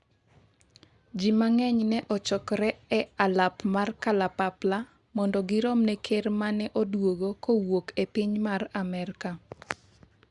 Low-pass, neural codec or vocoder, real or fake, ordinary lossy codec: 10.8 kHz; none; real; Opus, 64 kbps